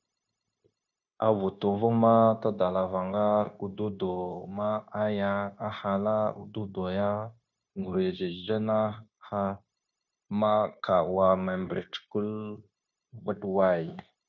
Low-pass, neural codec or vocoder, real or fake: 7.2 kHz; codec, 16 kHz, 0.9 kbps, LongCat-Audio-Codec; fake